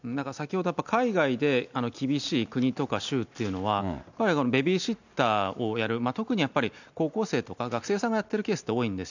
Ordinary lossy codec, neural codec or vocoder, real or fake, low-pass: none; none; real; 7.2 kHz